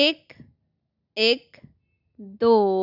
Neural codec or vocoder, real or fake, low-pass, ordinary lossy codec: none; real; 5.4 kHz; none